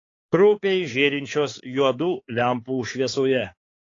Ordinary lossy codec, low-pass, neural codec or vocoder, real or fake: AAC, 32 kbps; 7.2 kHz; codec, 16 kHz, 4 kbps, X-Codec, HuBERT features, trained on balanced general audio; fake